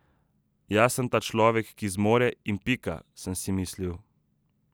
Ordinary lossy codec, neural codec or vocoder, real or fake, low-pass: none; none; real; none